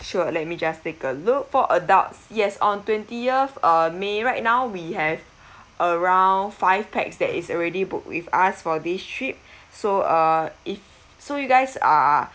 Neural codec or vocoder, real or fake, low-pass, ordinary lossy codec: none; real; none; none